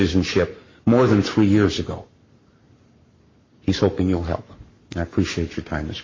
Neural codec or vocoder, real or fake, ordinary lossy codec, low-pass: codec, 44.1 kHz, 7.8 kbps, Pupu-Codec; fake; MP3, 32 kbps; 7.2 kHz